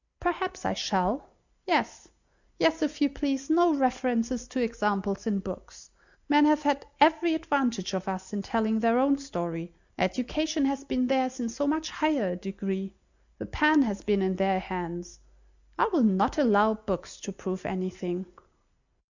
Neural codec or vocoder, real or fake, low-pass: none; real; 7.2 kHz